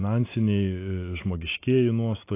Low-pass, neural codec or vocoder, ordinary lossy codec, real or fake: 3.6 kHz; none; AAC, 24 kbps; real